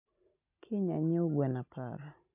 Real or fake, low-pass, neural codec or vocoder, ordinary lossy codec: real; 3.6 kHz; none; none